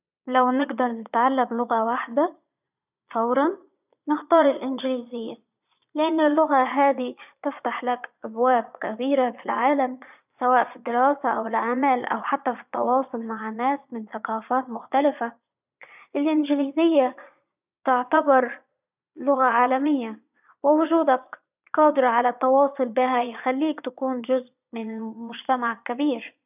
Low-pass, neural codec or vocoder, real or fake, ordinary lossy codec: 3.6 kHz; vocoder, 22.05 kHz, 80 mel bands, Vocos; fake; none